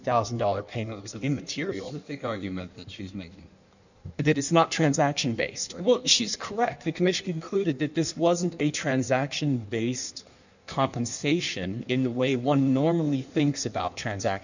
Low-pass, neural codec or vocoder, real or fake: 7.2 kHz; codec, 16 kHz in and 24 kHz out, 1.1 kbps, FireRedTTS-2 codec; fake